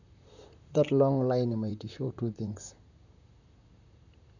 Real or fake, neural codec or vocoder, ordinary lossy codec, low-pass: fake; vocoder, 44.1 kHz, 128 mel bands, Pupu-Vocoder; none; 7.2 kHz